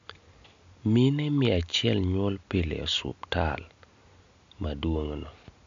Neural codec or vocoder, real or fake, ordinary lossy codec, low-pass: none; real; MP3, 64 kbps; 7.2 kHz